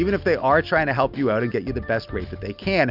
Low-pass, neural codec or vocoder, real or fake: 5.4 kHz; none; real